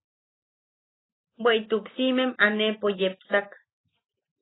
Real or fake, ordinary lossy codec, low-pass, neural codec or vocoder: real; AAC, 16 kbps; 7.2 kHz; none